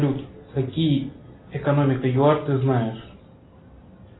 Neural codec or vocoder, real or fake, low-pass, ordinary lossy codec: none; real; 7.2 kHz; AAC, 16 kbps